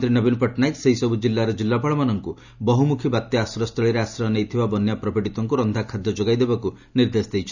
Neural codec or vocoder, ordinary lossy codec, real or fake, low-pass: none; none; real; 7.2 kHz